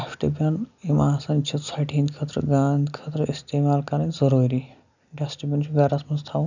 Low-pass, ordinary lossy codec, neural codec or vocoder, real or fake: 7.2 kHz; none; none; real